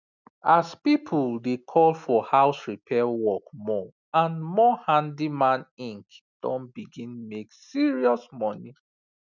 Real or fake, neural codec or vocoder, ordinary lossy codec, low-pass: real; none; none; 7.2 kHz